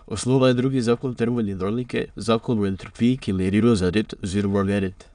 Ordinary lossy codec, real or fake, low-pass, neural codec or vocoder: none; fake; 9.9 kHz; autoencoder, 22.05 kHz, a latent of 192 numbers a frame, VITS, trained on many speakers